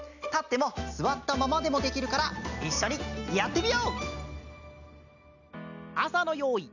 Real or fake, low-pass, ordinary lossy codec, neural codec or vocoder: real; 7.2 kHz; none; none